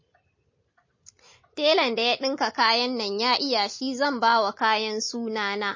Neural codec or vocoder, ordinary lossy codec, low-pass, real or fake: none; MP3, 32 kbps; 7.2 kHz; real